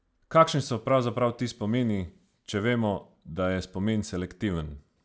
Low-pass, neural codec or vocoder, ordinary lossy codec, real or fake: none; none; none; real